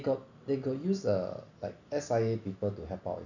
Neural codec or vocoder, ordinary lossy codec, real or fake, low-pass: none; none; real; 7.2 kHz